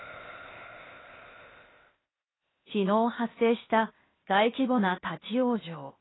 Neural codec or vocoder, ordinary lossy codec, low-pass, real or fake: codec, 16 kHz, 0.8 kbps, ZipCodec; AAC, 16 kbps; 7.2 kHz; fake